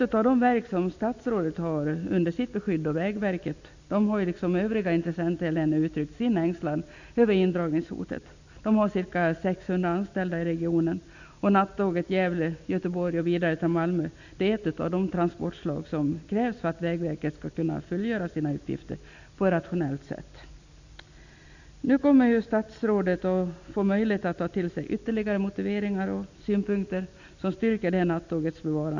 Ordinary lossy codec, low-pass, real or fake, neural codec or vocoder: none; 7.2 kHz; real; none